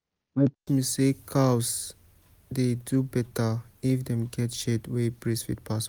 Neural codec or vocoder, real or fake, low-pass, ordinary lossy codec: none; real; none; none